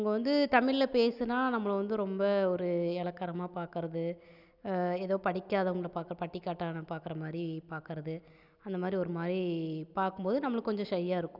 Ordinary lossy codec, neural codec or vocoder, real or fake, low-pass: none; none; real; 5.4 kHz